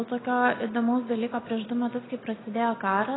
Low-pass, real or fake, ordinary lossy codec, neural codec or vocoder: 7.2 kHz; real; AAC, 16 kbps; none